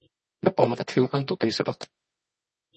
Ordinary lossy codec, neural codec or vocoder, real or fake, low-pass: MP3, 32 kbps; codec, 24 kHz, 0.9 kbps, WavTokenizer, medium music audio release; fake; 10.8 kHz